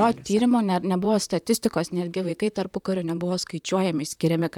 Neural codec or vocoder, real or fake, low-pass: vocoder, 44.1 kHz, 128 mel bands, Pupu-Vocoder; fake; 19.8 kHz